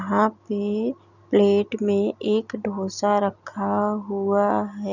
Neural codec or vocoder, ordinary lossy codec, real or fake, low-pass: none; none; real; 7.2 kHz